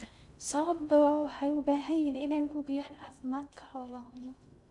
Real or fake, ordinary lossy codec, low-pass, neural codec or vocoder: fake; MP3, 96 kbps; 10.8 kHz; codec, 16 kHz in and 24 kHz out, 0.6 kbps, FocalCodec, streaming, 2048 codes